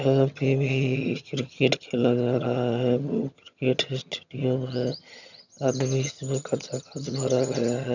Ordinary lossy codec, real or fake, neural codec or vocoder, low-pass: none; fake; vocoder, 22.05 kHz, 80 mel bands, HiFi-GAN; 7.2 kHz